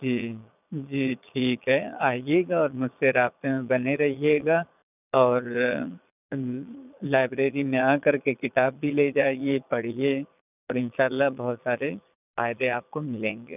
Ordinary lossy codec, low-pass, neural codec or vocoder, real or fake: none; 3.6 kHz; vocoder, 22.05 kHz, 80 mel bands, Vocos; fake